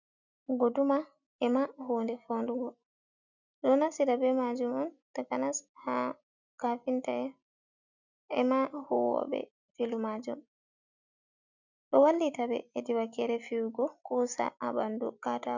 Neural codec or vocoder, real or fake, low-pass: none; real; 7.2 kHz